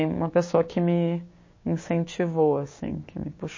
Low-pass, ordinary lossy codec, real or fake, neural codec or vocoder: 7.2 kHz; MP3, 32 kbps; fake; codec, 16 kHz, 6 kbps, DAC